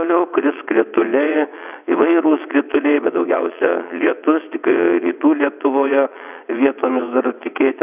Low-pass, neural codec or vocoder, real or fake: 3.6 kHz; vocoder, 22.05 kHz, 80 mel bands, WaveNeXt; fake